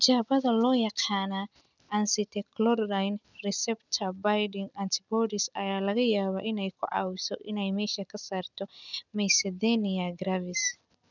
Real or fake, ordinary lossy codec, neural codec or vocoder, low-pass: real; none; none; 7.2 kHz